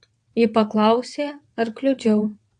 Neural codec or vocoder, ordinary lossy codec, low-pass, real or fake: vocoder, 22.05 kHz, 80 mel bands, WaveNeXt; AAC, 64 kbps; 9.9 kHz; fake